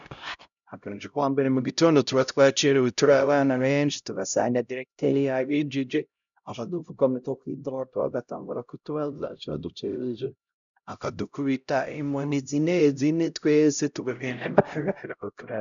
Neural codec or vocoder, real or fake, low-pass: codec, 16 kHz, 0.5 kbps, X-Codec, HuBERT features, trained on LibriSpeech; fake; 7.2 kHz